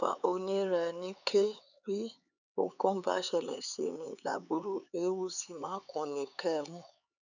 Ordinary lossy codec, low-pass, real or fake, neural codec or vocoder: none; 7.2 kHz; fake; codec, 16 kHz, 4 kbps, X-Codec, HuBERT features, trained on LibriSpeech